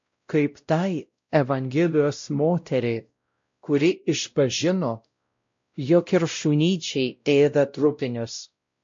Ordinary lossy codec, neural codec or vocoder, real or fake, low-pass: MP3, 48 kbps; codec, 16 kHz, 0.5 kbps, X-Codec, WavLM features, trained on Multilingual LibriSpeech; fake; 7.2 kHz